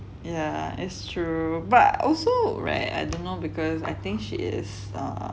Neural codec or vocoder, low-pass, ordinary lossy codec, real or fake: none; none; none; real